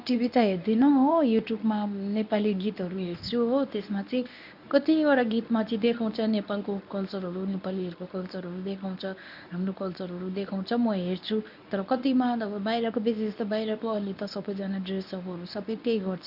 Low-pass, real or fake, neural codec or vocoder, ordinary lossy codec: 5.4 kHz; fake; codec, 24 kHz, 0.9 kbps, WavTokenizer, medium speech release version 1; none